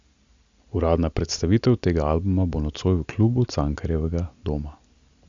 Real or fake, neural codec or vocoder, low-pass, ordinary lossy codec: real; none; 7.2 kHz; Opus, 64 kbps